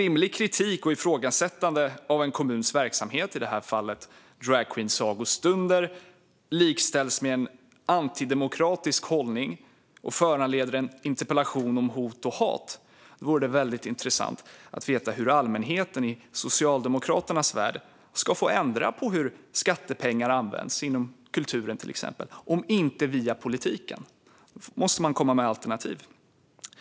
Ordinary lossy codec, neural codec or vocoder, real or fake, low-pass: none; none; real; none